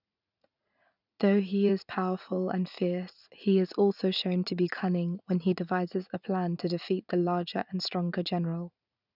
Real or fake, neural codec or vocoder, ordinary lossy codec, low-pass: fake; vocoder, 44.1 kHz, 80 mel bands, Vocos; none; 5.4 kHz